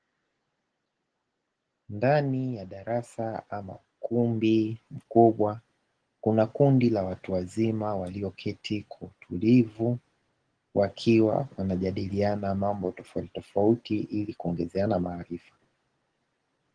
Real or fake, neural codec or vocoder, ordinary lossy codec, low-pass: real; none; Opus, 16 kbps; 9.9 kHz